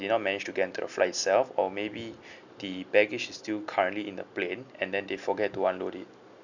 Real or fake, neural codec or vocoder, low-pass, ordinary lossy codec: real; none; 7.2 kHz; none